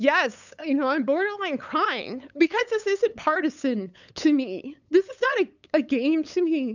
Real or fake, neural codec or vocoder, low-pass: fake; codec, 16 kHz, 8 kbps, FunCodec, trained on LibriTTS, 25 frames a second; 7.2 kHz